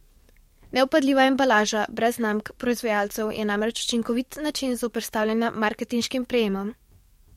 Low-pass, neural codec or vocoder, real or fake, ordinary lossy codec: 19.8 kHz; codec, 44.1 kHz, 7.8 kbps, DAC; fake; MP3, 64 kbps